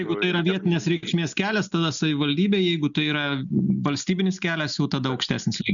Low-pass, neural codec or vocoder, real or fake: 7.2 kHz; none; real